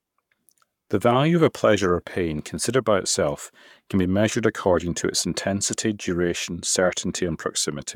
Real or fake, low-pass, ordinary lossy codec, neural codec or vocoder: fake; 19.8 kHz; none; codec, 44.1 kHz, 7.8 kbps, Pupu-Codec